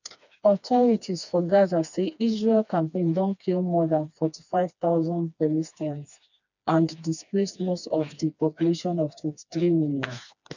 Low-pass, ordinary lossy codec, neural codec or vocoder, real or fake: 7.2 kHz; none; codec, 16 kHz, 2 kbps, FreqCodec, smaller model; fake